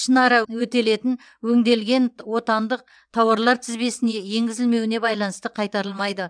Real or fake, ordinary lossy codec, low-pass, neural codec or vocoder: fake; none; 9.9 kHz; vocoder, 22.05 kHz, 80 mel bands, WaveNeXt